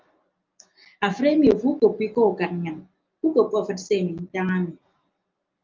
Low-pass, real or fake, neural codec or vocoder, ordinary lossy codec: 7.2 kHz; real; none; Opus, 24 kbps